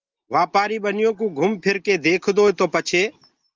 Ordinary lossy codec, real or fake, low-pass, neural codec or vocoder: Opus, 32 kbps; real; 7.2 kHz; none